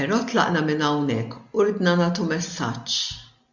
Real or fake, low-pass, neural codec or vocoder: real; 7.2 kHz; none